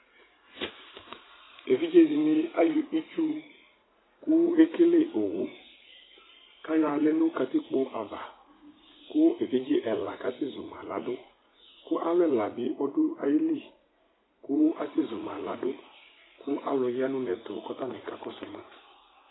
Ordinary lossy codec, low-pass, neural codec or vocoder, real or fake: AAC, 16 kbps; 7.2 kHz; vocoder, 44.1 kHz, 80 mel bands, Vocos; fake